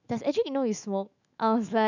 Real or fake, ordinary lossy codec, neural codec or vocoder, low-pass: fake; none; autoencoder, 48 kHz, 128 numbers a frame, DAC-VAE, trained on Japanese speech; 7.2 kHz